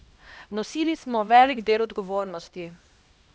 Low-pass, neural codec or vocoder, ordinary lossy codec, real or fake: none; codec, 16 kHz, 1 kbps, X-Codec, HuBERT features, trained on LibriSpeech; none; fake